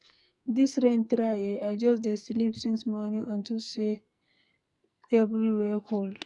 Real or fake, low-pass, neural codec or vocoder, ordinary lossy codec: fake; 10.8 kHz; codec, 44.1 kHz, 2.6 kbps, SNAC; none